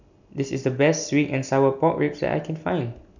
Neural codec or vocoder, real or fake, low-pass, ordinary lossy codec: none; real; 7.2 kHz; none